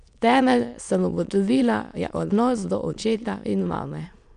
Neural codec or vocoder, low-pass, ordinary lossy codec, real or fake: autoencoder, 22.05 kHz, a latent of 192 numbers a frame, VITS, trained on many speakers; 9.9 kHz; none; fake